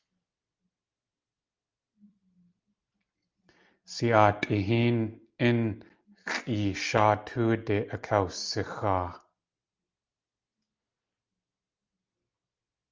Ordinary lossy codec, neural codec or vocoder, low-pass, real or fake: Opus, 24 kbps; none; 7.2 kHz; real